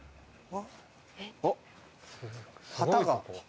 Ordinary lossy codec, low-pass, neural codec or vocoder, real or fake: none; none; none; real